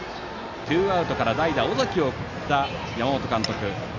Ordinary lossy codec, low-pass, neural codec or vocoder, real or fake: none; 7.2 kHz; none; real